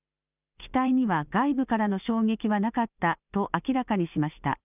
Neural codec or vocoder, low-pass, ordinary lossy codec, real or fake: none; 3.6 kHz; none; real